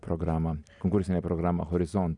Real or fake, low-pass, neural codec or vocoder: real; 10.8 kHz; none